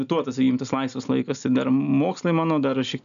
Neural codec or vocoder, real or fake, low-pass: none; real; 7.2 kHz